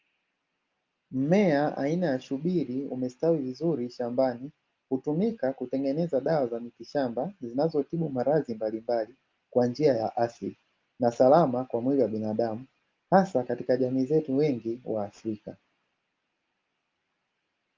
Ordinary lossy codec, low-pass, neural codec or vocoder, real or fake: Opus, 32 kbps; 7.2 kHz; none; real